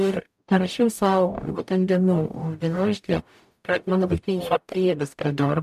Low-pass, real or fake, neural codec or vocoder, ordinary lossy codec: 14.4 kHz; fake; codec, 44.1 kHz, 0.9 kbps, DAC; MP3, 96 kbps